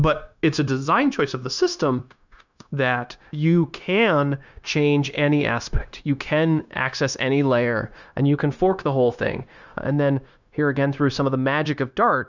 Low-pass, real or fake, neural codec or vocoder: 7.2 kHz; fake; codec, 16 kHz, 0.9 kbps, LongCat-Audio-Codec